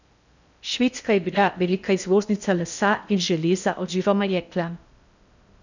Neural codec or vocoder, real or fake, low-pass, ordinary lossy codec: codec, 16 kHz in and 24 kHz out, 0.6 kbps, FocalCodec, streaming, 4096 codes; fake; 7.2 kHz; none